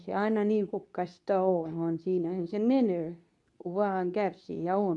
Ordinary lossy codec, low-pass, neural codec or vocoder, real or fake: none; 10.8 kHz; codec, 24 kHz, 0.9 kbps, WavTokenizer, medium speech release version 2; fake